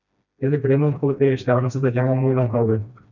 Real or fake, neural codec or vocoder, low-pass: fake; codec, 16 kHz, 1 kbps, FreqCodec, smaller model; 7.2 kHz